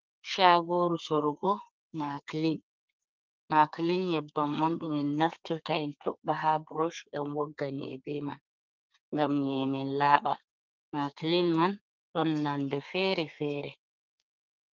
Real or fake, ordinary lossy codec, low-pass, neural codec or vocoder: fake; Opus, 32 kbps; 7.2 kHz; codec, 32 kHz, 1.9 kbps, SNAC